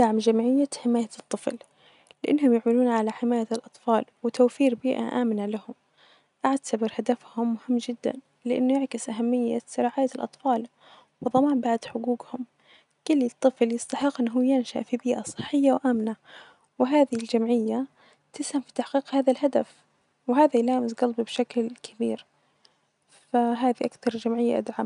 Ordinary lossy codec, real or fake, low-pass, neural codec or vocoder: none; real; 10.8 kHz; none